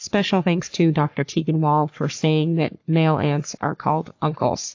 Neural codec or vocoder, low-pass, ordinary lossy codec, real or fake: codec, 44.1 kHz, 3.4 kbps, Pupu-Codec; 7.2 kHz; AAC, 48 kbps; fake